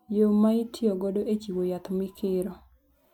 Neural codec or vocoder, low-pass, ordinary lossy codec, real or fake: none; 19.8 kHz; none; real